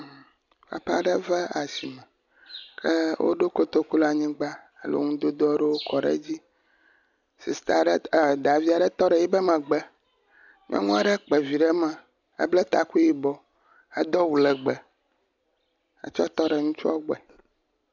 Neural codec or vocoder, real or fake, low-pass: none; real; 7.2 kHz